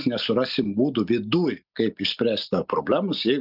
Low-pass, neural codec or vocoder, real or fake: 5.4 kHz; none; real